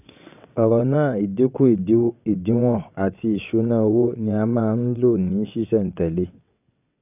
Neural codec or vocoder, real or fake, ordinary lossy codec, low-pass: vocoder, 22.05 kHz, 80 mel bands, WaveNeXt; fake; none; 3.6 kHz